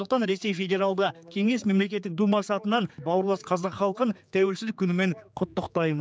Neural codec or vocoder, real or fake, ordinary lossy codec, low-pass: codec, 16 kHz, 4 kbps, X-Codec, HuBERT features, trained on general audio; fake; none; none